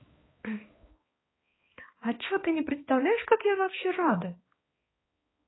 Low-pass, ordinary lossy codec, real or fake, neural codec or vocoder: 7.2 kHz; AAC, 16 kbps; fake; codec, 16 kHz, 2 kbps, X-Codec, HuBERT features, trained on balanced general audio